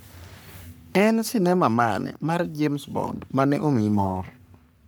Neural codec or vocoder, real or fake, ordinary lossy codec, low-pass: codec, 44.1 kHz, 3.4 kbps, Pupu-Codec; fake; none; none